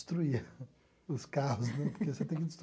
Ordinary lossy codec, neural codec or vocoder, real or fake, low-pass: none; none; real; none